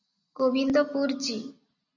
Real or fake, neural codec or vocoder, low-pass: real; none; 7.2 kHz